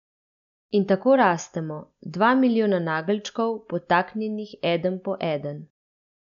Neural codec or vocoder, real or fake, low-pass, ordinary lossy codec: none; real; 7.2 kHz; none